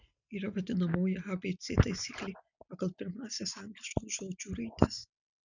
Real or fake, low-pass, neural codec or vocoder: real; 7.2 kHz; none